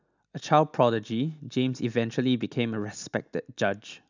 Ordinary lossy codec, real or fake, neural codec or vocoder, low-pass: none; real; none; 7.2 kHz